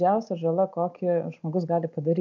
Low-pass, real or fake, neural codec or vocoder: 7.2 kHz; real; none